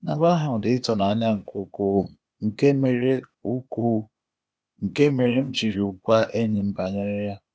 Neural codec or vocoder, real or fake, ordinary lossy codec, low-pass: codec, 16 kHz, 0.8 kbps, ZipCodec; fake; none; none